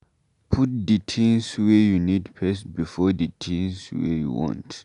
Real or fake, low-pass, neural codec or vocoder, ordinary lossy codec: real; 10.8 kHz; none; none